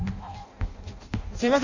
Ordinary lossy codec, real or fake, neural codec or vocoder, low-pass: AAC, 48 kbps; fake; codec, 16 kHz in and 24 kHz out, 0.6 kbps, FireRedTTS-2 codec; 7.2 kHz